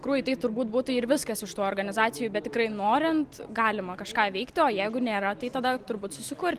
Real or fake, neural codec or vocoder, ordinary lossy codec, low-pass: real; none; Opus, 24 kbps; 14.4 kHz